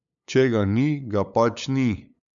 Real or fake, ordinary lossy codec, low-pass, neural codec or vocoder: fake; none; 7.2 kHz; codec, 16 kHz, 8 kbps, FunCodec, trained on LibriTTS, 25 frames a second